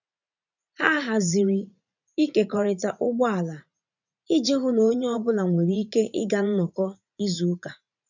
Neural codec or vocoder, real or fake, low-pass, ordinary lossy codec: vocoder, 44.1 kHz, 80 mel bands, Vocos; fake; 7.2 kHz; none